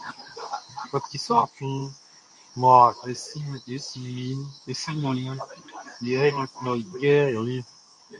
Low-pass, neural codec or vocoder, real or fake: 10.8 kHz; codec, 24 kHz, 0.9 kbps, WavTokenizer, medium speech release version 2; fake